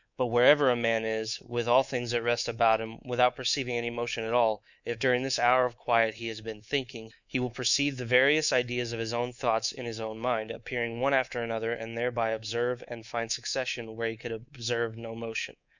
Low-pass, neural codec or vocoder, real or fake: 7.2 kHz; codec, 24 kHz, 3.1 kbps, DualCodec; fake